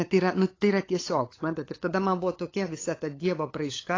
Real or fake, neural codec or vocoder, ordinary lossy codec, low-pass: fake; codec, 16 kHz, 8 kbps, FunCodec, trained on LibriTTS, 25 frames a second; AAC, 32 kbps; 7.2 kHz